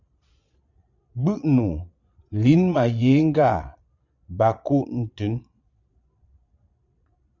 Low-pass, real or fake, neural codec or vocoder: 7.2 kHz; fake; vocoder, 22.05 kHz, 80 mel bands, Vocos